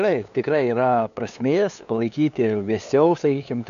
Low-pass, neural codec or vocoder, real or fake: 7.2 kHz; codec, 16 kHz, 2 kbps, FunCodec, trained on LibriTTS, 25 frames a second; fake